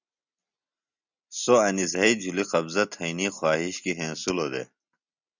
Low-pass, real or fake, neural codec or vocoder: 7.2 kHz; real; none